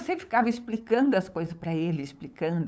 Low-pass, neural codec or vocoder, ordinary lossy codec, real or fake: none; codec, 16 kHz, 8 kbps, FunCodec, trained on LibriTTS, 25 frames a second; none; fake